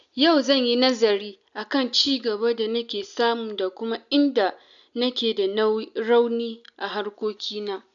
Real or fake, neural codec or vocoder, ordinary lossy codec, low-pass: real; none; none; 7.2 kHz